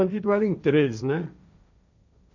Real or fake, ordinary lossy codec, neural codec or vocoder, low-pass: fake; none; codec, 16 kHz, 1.1 kbps, Voila-Tokenizer; 7.2 kHz